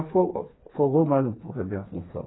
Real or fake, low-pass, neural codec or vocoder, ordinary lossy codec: fake; 7.2 kHz; codec, 16 kHz, 4 kbps, FreqCodec, smaller model; AAC, 16 kbps